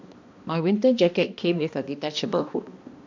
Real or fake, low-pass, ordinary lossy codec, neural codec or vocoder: fake; 7.2 kHz; MP3, 64 kbps; codec, 16 kHz, 1 kbps, X-Codec, HuBERT features, trained on balanced general audio